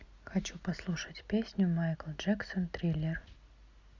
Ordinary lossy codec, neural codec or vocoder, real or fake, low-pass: Opus, 64 kbps; none; real; 7.2 kHz